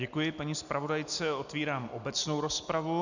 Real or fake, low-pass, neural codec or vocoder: real; 7.2 kHz; none